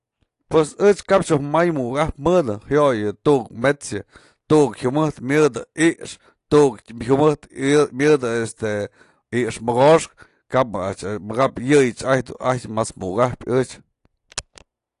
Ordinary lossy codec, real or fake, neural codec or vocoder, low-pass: AAC, 48 kbps; real; none; 10.8 kHz